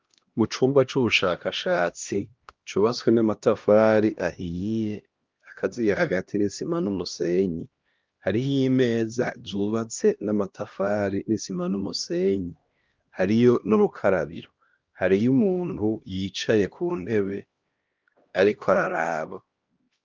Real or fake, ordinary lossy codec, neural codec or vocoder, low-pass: fake; Opus, 32 kbps; codec, 16 kHz, 1 kbps, X-Codec, HuBERT features, trained on LibriSpeech; 7.2 kHz